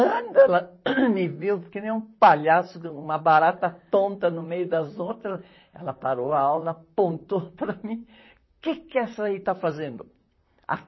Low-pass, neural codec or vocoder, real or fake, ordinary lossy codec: 7.2 kHz; vocoder, 44.1 kHz, 128 mel bands, Pupu-Vocoder; fake; MP3, 24 kbps